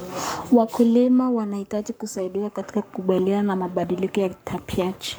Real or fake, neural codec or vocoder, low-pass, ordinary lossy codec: fake; codec, 44.1 kHz, 7.8 kbps, Pupu-Codec; none; none